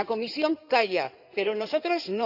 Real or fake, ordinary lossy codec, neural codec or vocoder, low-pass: fake; none; codec, 16 kHz in and 24 kHz out, 2.2 kbps, FireRedTTS-2 codec; 5.4 kHz